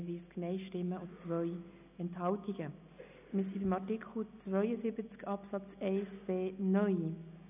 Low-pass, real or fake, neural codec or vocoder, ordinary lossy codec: 3.6 kHz; real; none; none